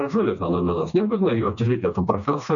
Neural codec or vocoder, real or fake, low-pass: codec, 16 kHz, 2 kbps, FreqCodec, smaller model; fake; 7.2 kHz